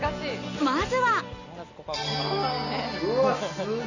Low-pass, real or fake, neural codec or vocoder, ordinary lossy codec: 7.2 kHz; real; none; none